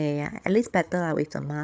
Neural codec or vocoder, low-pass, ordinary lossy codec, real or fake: codec, 16 kHz, 16 kbps, FreqCodec, larger model; none; none; fake